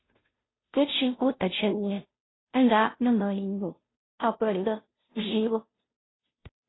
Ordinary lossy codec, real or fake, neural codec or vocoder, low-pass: AAC, 16 kbps; fake; codec, 16 kHz, 0.5 kbps, FunCodec, trained on Chinese and English, 25 frames a second; 7.2 kHz